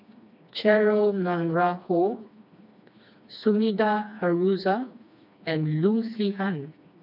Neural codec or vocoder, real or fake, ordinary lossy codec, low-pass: codec, 16 kHz, 2 kbps, FreqCodec, smaller model; fake; none; 5.4 kHz